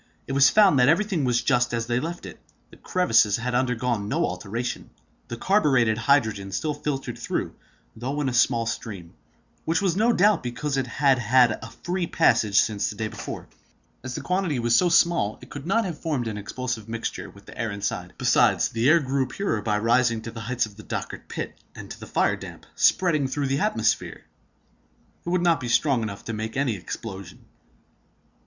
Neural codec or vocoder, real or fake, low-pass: none; real; 7.2 kHz